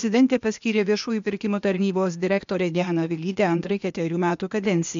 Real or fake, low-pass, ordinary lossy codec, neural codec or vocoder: fake; 7.2 kHz; MP3, 64 kbps; codec, 16 kHz, 0.8 kbps, ZipCodec